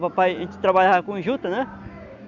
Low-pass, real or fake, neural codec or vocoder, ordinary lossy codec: 7.2 kHz; real; none; none